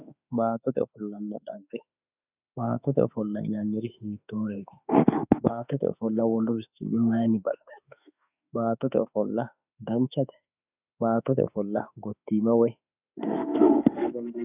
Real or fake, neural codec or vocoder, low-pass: fake; autoencoder, 48 kHz, 32 numbers a frame, DAC-VAE, trained on Japanese speech; 3.6 kHz